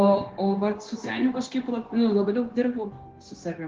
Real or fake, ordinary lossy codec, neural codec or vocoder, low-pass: fake; Opus, 16 kbps; codec, 16 kHz, 0.9 kbps, LongCat-Audio-Codec; 7.2 kHz